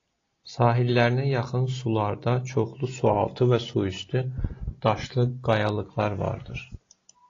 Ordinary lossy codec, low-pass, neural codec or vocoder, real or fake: AAC, 32 kbps; 7.2 kHz; none; real